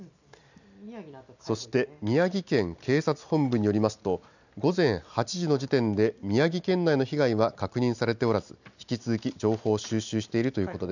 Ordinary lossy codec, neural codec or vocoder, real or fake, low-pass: none; none; real; 7.2 kHz